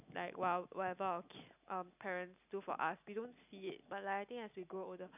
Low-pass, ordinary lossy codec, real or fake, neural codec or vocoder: 3.6 kHz; none; real; none